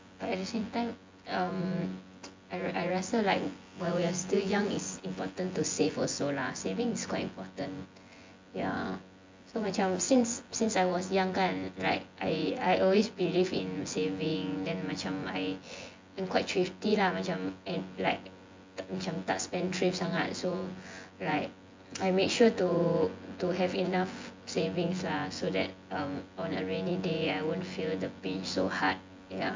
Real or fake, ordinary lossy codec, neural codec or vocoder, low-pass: fake; MP3, 48 kbps; vocoder, 24 kHz, 100 mel bands, Vocos; 7.2 kHz